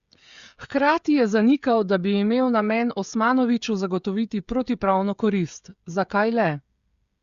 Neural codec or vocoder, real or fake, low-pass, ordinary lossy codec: codec, 16 kHz, 16 kbps, FreqCodec, smaller model; fake; 7.2 kHz; Opus, 64 kbps